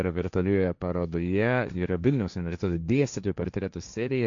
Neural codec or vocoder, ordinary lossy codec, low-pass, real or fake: codec, 16 kHz, 1.1 kbps, Voila-Tokenizer; MP3, 64 kbps; 7.2 kHz; fake